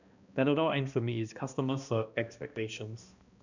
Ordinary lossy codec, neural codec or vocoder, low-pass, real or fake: none; codec, 16 kHz, 1 kbps, X-Codec, HuBERT features, trained on balanced general audio; 7.2 kHz; fake